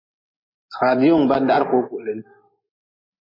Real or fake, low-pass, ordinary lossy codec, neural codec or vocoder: real; 5.4 kHz; MP3, 24 kbps; none